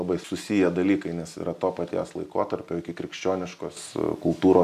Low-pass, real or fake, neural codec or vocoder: 14.4 kHz; real; none